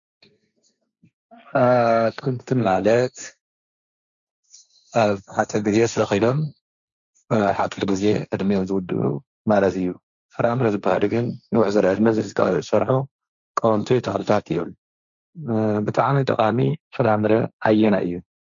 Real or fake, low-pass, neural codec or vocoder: fake; 7.2 kHz; codec, 16 kHz, 1.1 kbps, Voila-Tokenizer